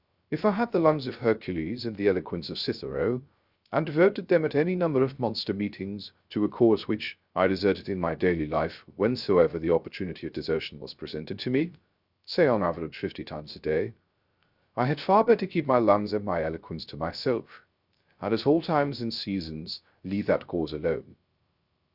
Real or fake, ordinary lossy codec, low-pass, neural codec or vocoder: fake; Opus, 64 kbps; 5.4 kHz; codec, 16 kHz, 0.3 kbps, FocalCodec